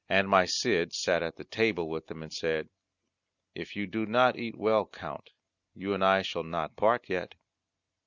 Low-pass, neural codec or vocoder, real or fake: 7.2 kHz; none; real